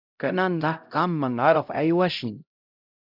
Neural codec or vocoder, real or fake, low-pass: codec, 16 kHz, 0.5 kbps, X-Codec, HuBERT features, trained on LibriSpeech; fake; 5.4 kHz